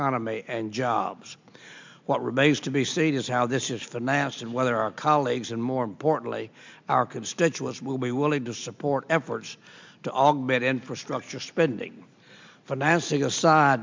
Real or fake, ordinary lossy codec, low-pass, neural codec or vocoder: real; MP3, 64 kbps; 7.2 kHz; none